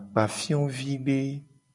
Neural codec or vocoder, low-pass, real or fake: none; 10.8 kHz; real